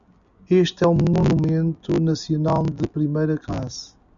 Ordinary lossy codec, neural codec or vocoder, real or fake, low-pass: MP3, 96 kbps; none; real; 7.2 kHz